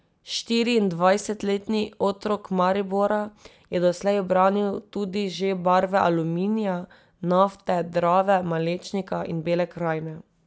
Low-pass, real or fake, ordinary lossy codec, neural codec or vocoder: none; real; none; none